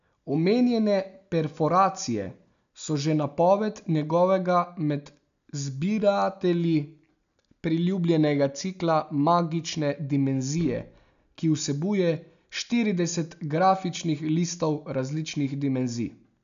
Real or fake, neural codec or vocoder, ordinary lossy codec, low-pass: real; none; none; 7.2 kHz